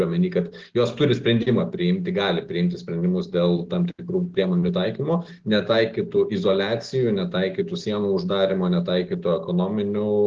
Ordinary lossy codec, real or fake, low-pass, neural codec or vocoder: Opus, 16 kbps; real; 7.2 kHz; none